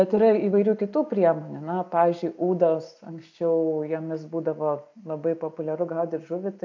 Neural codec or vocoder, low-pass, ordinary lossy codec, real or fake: none; 7.2 kHz; AAC, 48 kbps; real